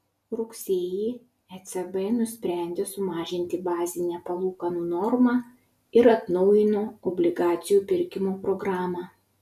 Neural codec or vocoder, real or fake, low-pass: none; real; 14.4 kHz